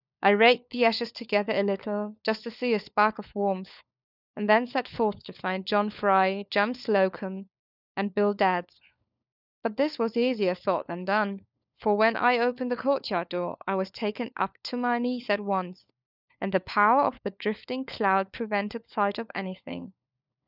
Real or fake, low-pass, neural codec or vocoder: fake; 5.4 kHz; codec, 16 kHz, 4 kbps, FunCodec, trained on LibriTTS, 50 frames a second